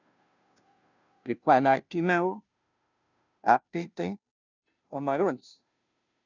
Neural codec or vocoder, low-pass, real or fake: codec, 16 kHz, 0.5 kbps, FunCodec, trained on Chinese and English, 25 frames a second; 7.2 kHz; fake